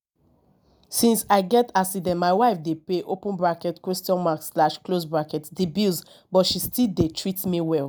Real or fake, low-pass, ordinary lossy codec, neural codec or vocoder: real; none; none; none